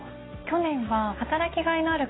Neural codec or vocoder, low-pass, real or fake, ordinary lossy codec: none; 7.2 kHz; real; AAC, 16 kbps